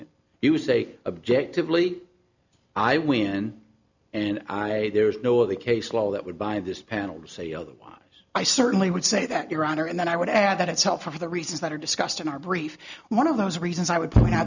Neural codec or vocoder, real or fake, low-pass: none; real; 7.2 kHz